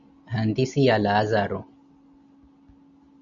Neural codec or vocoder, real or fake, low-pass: none; real; 7.2 kHz